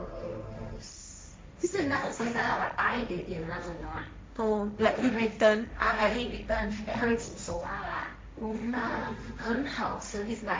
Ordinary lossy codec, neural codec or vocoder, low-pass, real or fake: none; codec, 16 kHz, 1.1 kbps, Voila-Tokenizer; none; fake